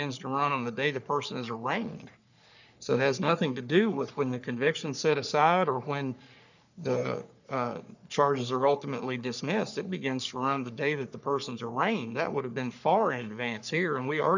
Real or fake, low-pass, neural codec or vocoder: fake; 7.2 kHz; codec, 44.1 kHz, 3.4 kbps, Pupu-Codec